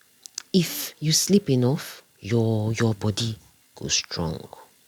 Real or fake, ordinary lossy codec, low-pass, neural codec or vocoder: real; none; 19.8 kHz; none